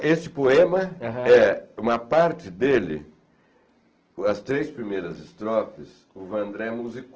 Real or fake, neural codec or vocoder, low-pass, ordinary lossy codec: real; none; 7.2 kHz; Opus, 16 kbps